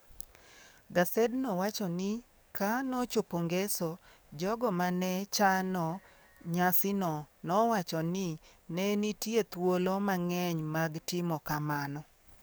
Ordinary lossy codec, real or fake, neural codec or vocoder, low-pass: none; fake; codec, 44.1 kHz, 7.8 kbps, DAC; none